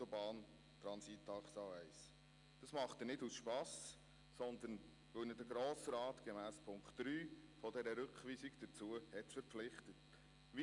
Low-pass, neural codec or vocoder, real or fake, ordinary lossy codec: 10.8 kHz; none; real; none